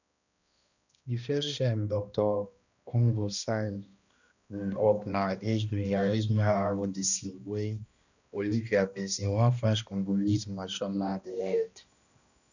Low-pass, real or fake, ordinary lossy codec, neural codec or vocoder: 7.2 kHz; fake; none; codec, 16 kHz, 1 kbps, X-Codec, HuBERT features, trained on balanced general audio